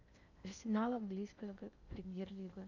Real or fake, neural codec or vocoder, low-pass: fake; codec, 16 kHz in and 24 kHz out, 0.6 kbps, FocalCodec, streaming, 2048 codes; 7.2 kHz